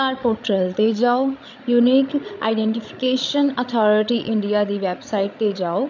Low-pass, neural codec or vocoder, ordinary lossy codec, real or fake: 7.2 kHz; codec, 16 kHz, 16 kbps, FreqCodec, larger model; none; fake